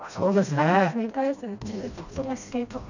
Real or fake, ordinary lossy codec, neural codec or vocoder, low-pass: fake; none; codec, 16 kHz, 1 kbps, FreqCodec, smaller model; 7.2 kHz